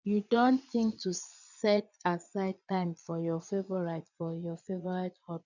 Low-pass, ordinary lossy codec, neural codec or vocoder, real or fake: 7.2 kHz; none; vocoder, 22.05 kHz, 80 mel bands, Vocos; fake